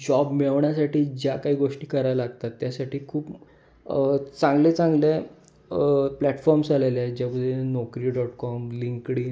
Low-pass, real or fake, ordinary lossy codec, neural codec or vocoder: none; real; none; none